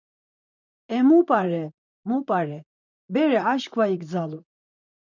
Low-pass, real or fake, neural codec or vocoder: 7.2 kHz; fake; vocoder, 44.1 kHz, 128 mel bands, Pupu-Vocoder